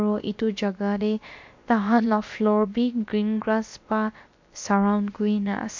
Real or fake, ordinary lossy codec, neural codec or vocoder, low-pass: fake; MP3, 48 kbps; codec, 16 kHz, about 1 kbps, DyCAST, with the encoder's durations; 7.2 kHz